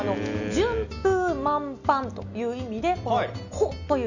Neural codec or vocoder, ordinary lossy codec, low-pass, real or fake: none; none; 7.2 kHz; real